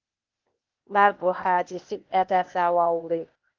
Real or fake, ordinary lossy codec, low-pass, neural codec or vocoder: fake; Opus, 24 kbps; 7.2 kHz; codec, 16 kHz, 0.8 kbps, ZipCodec